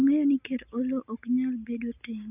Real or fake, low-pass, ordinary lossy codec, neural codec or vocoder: real; 3.6 kHz; none; none